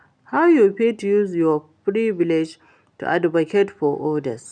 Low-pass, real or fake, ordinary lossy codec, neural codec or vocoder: 9.9 kHz; real; none; none